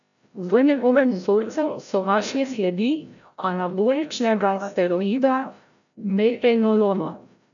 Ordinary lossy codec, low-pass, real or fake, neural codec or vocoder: none; 7.2 kHz; fake; codec, 16 kHz, 0.5 kbps, FreqCodec, larger model